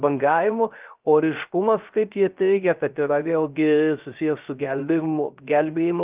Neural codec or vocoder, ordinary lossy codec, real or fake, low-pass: codec, 16 kHz, 0.3 kbps, FocalCodec; Opus, 32 kbps; fake; 3.6 kHz